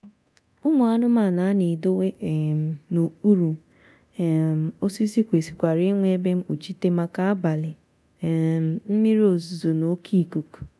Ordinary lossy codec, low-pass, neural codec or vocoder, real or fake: none; none; codec, 24 kHz, 0.9 kbps, DualCodec; fake